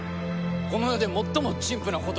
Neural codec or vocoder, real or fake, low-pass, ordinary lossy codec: none; real; none; none